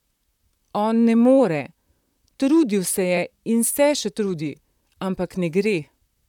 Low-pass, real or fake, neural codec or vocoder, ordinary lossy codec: 19.8 kHz; fake; vocoder, 44.1 kHz, 128 mel bands, Pupu-Vocoder; none